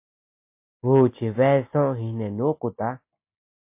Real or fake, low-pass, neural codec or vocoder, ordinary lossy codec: real; 3.6 kHz; none; MP3, 24 kbps